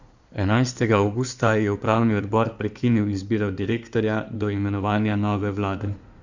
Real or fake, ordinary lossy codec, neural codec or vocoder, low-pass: fake; none; codec, 16 kHz in and 24 kHz out, 2.2 kbps, FireRedTTS-2 codec; 7.2 kHz